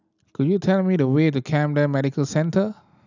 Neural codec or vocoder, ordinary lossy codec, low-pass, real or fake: none; none; 7.2 kHz; real